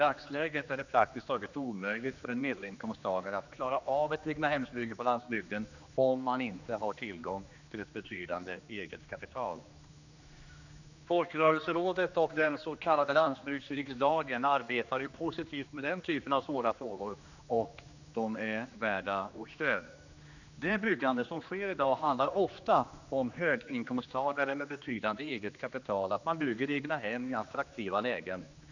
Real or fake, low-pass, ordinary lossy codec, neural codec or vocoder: fake; 7.2 kHz; none; codec, 16 kHz, 2 kbps, X-Codec, HuBERT features, trained on general audio